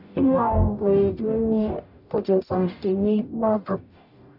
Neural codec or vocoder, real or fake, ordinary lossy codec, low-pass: codec, 44.1 kHz, 0.9 kbps, DAC; fake; none; 5.4 kHz